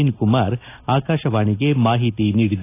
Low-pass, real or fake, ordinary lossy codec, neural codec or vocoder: 3.6 kHz; real; AAC, 24 kbps; none